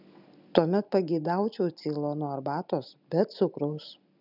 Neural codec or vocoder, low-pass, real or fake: none; 5.4 kHz; real